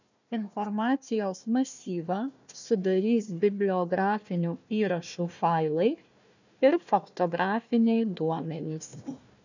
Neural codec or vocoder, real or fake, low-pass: codec, 16 kHz, 1 kbps, FunCodec, trained on Chinese and English, 50 frames a second; fake; 7.2 kHz